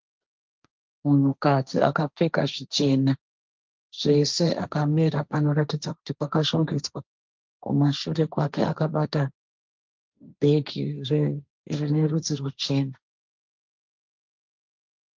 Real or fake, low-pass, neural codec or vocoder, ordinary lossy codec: fake; 7.2 kHz; codec, 16 kHz, 1.1 kbps, Voila-Tokenizer; Opus, 24 kbps